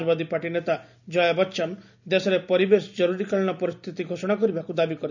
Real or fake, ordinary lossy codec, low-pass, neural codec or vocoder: real; none; 7.2 kHz; none